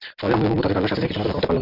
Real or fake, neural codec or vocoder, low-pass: real; none; 5.4 kHz